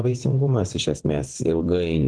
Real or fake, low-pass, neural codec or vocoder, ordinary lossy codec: fake; 9.9 kHz; vocoder, 22.05 kHz, 80 mel bands, WaveNeXt; Opus, 16 kbps